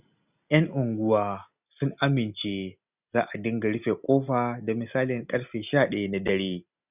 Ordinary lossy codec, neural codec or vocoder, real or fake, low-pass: none; none; real; 3.6 kHz